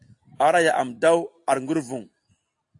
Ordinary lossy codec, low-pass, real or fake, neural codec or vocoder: AAC, 48 kbps; 10.8 kHz; real; none